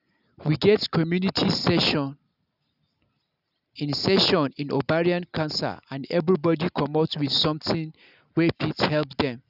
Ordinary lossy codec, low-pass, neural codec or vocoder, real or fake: none; 5.4 kHz; none; real